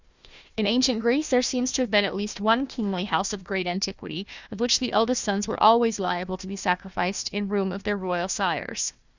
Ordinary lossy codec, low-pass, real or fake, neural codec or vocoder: Opus, 64 kbps; 7.2 kHz; fake; codec, 16 kHz, 1 kbps, FunCodec, trained on Chinese and English, 50 frames a second